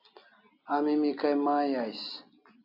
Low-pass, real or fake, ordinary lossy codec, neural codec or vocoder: 5.4 kHz; real; AAC, 48 kbps; none